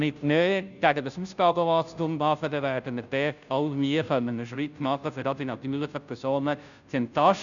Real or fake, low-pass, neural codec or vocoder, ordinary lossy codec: fake; 7.2 kHz; codec, 16 kHz, 0.5 kbps, FunCodec, trained on Chinese and English, 25 frames a second; none